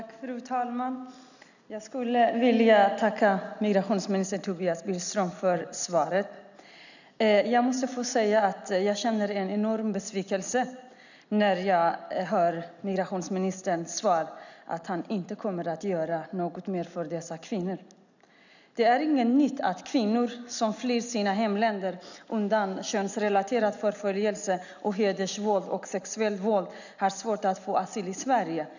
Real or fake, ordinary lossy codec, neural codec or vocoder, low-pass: real; none; none; 7.2 kHz